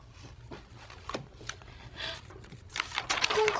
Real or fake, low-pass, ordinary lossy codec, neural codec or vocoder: fake; none; none; codec, 16 kHz, 16 kbps, FreqCodec, larger model